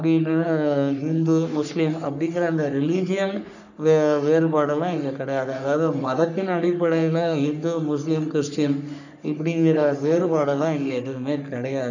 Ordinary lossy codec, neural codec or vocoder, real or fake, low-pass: none; codec, 44.1 kHz, 3.4 kbps, Pupu-Codec; fake; 7.2 kHz